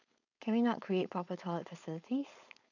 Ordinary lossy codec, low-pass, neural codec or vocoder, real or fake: none; 7.2 kHz; codec, 16 kHz, 4.8 kbps, FACodec; fake